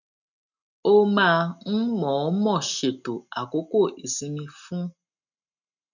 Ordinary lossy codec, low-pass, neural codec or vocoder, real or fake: none; 7.2 kHz; none; real